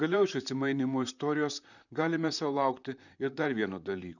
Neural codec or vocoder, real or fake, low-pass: vocoder, 44.1 kHz, 128 mel bands, Pupu-Vocoder; fake; 7.2 kHz